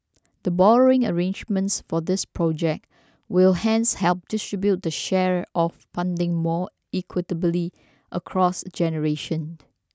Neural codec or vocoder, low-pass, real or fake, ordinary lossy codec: none; none; real; none